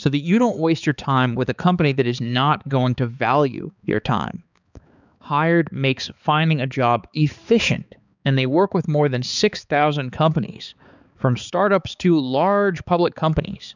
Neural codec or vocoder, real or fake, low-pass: codec, 16 kHz, 4 kbps, X-Codec, HuBERT features, trained on balanced general audio; fake; 7.2 kHz